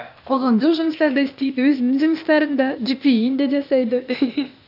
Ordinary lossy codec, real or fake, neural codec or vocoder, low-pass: none; fake; codec, 16 kHz, 0.8 kbps, ZipCodec; 5.4 kHz